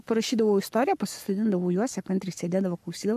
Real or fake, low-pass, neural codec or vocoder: fake; 14.4 kHz; codec, 44.1 kHz, 7.8 kbps, Pupu-Codec